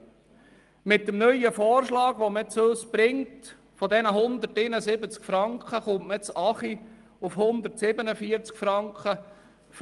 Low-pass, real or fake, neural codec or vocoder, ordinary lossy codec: 10.8 kHz; real; none; Opus, 32 kbps